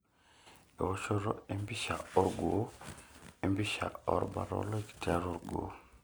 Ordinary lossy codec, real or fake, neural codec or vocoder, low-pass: none; real; none; none